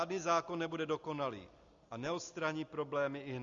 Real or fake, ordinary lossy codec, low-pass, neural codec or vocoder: real; Opus, 64 kbps; 7.2 kHz; none